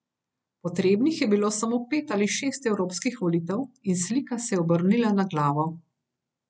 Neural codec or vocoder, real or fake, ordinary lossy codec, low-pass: none; real; none; none